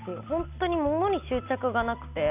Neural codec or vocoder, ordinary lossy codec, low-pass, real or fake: none; none; 3.6 kHz; real